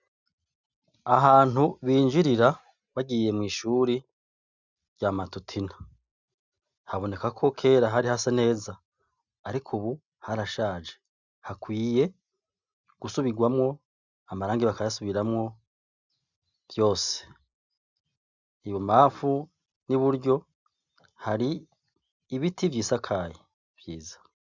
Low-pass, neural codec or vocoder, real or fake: 7.2 kHz; none; real